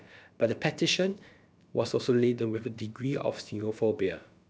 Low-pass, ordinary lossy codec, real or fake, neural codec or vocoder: none; none; fake; codec, 16 kHz, about 1 kbps, DyCAST, with the encoder's durations